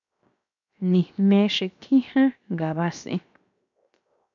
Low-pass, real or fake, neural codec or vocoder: 7.2 kHz; fake; codec, 16 kHz, 0.7 kbps, FocalCodec